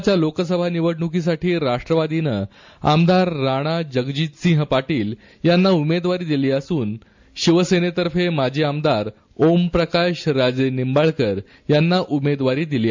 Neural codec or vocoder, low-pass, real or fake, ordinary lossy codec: none; 7.2 kHz; real; MP3, 64 kbps